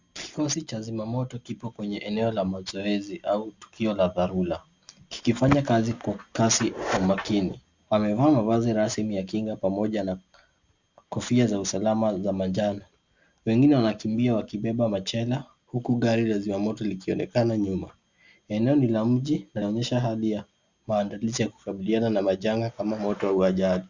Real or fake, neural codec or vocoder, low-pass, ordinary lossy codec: real; none; 7.2 kHz; Opus, 64 kbps